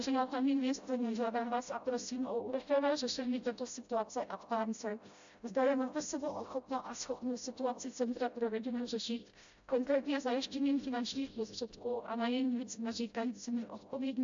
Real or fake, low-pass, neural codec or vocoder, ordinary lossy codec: fake; 7.2 kHz; codec, 16 kHz, 0.5 kbps, FreqCodec, smaller model; AAC, 64 kbps